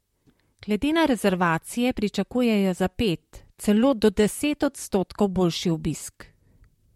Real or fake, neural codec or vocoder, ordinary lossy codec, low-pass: fake; vocoder, 44.1 kHz, 128 mel bands, Pupu-Vocoder; MP3, 64 kbps; 19.8 kHz